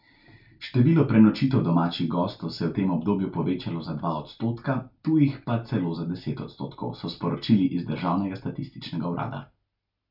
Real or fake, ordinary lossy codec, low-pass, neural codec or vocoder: real; none; 5.4 kHz; none